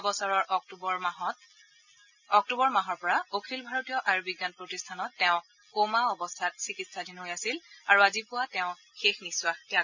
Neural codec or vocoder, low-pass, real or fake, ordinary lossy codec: none; 7.2 kHz; real; none